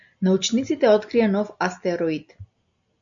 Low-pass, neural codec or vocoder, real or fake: 7.2 kHz; none; real